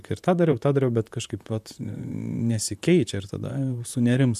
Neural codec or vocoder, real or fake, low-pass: vocoder, 44.1 kHz, 128 mel bands, Pupu-Vocoder; fake; 14.4 kHz